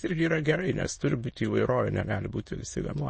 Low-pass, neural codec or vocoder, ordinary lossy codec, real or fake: 9.9 kHz; autoencoder, 22.05 kHz, a latent of 192 numbers a frame, VITS, trained on many speakers; MP3, 32 kbps; fake